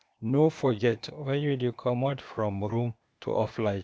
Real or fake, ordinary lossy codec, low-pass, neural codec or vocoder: fake; none; none; codec, 16 kHz, 0.8 kbps, ZipCodec